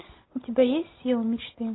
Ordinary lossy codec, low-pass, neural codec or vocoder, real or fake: AAC, 16 kbps; 7.2 kHz; none; real